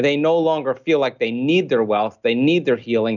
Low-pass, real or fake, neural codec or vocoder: 7.2 kHz; real; none